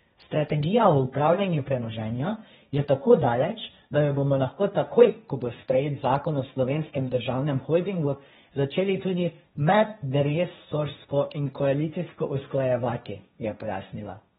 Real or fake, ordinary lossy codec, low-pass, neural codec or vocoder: fake; AAC, 16 kbps; 7.2 kHz; codec, 16 kHz, 1.1 kbps, Voila-Tokenizer